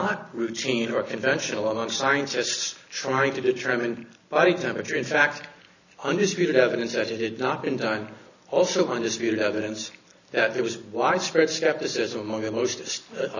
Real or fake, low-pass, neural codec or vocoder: real; 7.2 kHz; none